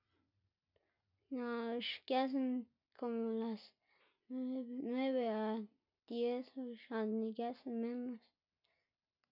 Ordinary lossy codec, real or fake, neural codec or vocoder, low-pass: none; real; none; 5.4 kHz